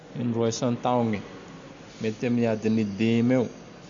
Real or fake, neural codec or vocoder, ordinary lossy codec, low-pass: real; none; MP3, 64 kbps; 7.2 kHz